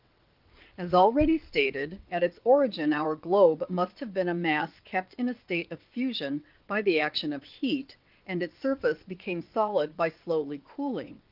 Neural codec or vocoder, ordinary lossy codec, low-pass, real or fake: vocoder, 22.05 kHz, 80 mel bands, WaveNeXt; Opus, 24 kbps; 5.4 kHz; fake